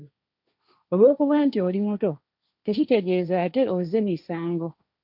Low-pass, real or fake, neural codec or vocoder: 5.4 kHz; fake; codec, 16 kHz, 1.1 kbps, Voila-Tokenizer